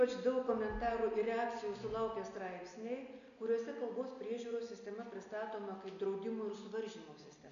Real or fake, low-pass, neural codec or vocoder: real; 7.2 kHz; none